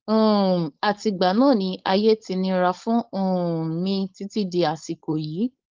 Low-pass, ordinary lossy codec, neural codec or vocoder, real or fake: 7.2 kHz; Opus, 32 kbps; codec, 16 kHz, 4.8 kbps, FACodec; fake